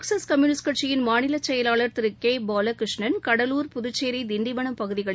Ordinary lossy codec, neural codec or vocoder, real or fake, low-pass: none; none; real; none